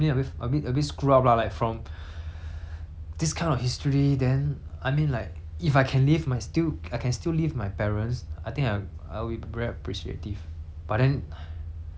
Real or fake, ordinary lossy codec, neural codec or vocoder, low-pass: real; none; none; none